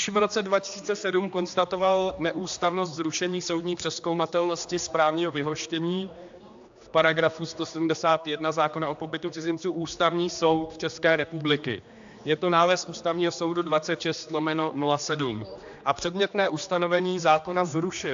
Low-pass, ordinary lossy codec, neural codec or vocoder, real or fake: 7.2 kHz; AAC, 64 kbps; codec, 16 kHz, 2 kbps, X-Codec, HuBERT features, trained on general audio; fake